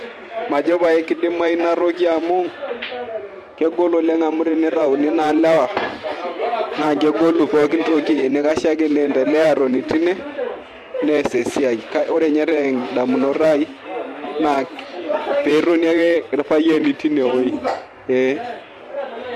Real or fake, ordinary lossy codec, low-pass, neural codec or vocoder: fake; MP3, 64 kbps; 14.4 kHz; vocoder, 44.1 kHz, 128 mel bands every 512 samples, BigVGAN v2